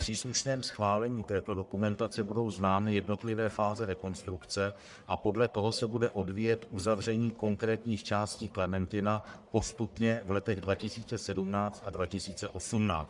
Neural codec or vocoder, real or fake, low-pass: codec, 44.1 kHz, 1.7 kbps, Pupu-Codec; fake; 10.8 kHz